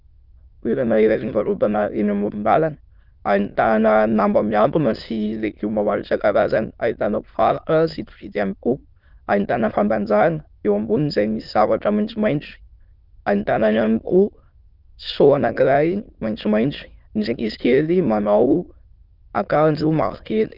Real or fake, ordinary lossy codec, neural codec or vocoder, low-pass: fake; Opus, 32 kbps; autoencoder, 22.05 kHz, a latent of 192 numbers a frame, VITS, trained on many speakers; 5.4 kHz